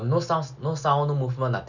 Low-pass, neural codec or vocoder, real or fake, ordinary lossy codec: 7.2 kHz; none; real; none